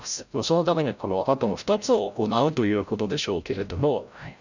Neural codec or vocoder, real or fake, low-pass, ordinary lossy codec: codec, 16 kHz, 0.5 kbps, FreqCodec, larger model; fake; 7.2 kHz; none